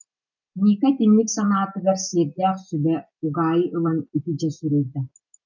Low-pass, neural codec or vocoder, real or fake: 7.2 kHz; none; real